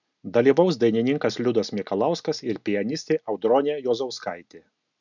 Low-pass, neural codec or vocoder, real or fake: 7.2 kHz; none; real